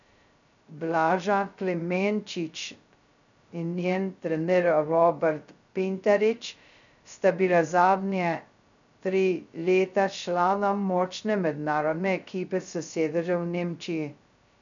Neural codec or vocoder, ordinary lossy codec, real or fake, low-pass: codec, 16 kHz, 0.2 kbps, FocalCodec; none; fake; 7.2 kHz